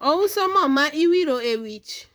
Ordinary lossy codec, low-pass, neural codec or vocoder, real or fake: none; none; vocoder, 44.1 kHz, 128 mel bands, Pupu-Vocoder; fake